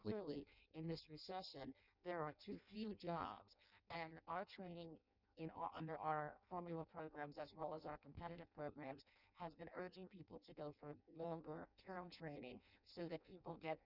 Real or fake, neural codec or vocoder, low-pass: fake; codec, 16 kHz in and 24 kHz out, 0.6 kbps, FireRedTTS-2 codec; 5.4 kHz